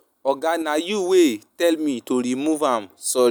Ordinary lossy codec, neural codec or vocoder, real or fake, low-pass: none; none; real; none